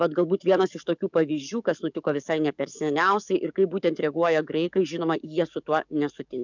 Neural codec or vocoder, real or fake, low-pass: codec, 44.1 kHz, 7.8 kbps, DAC; fake; 7.2 kHz